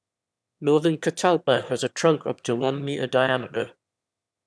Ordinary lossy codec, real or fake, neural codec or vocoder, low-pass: none; fake; autoencoder, 22.05 kHz, a latent of 192 numbers a frame, VITS, trained on one speaker; none